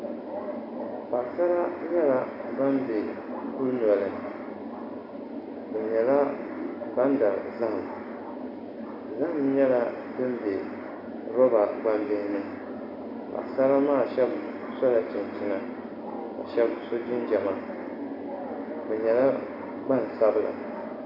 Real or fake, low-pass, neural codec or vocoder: real; 5.4 kHz; none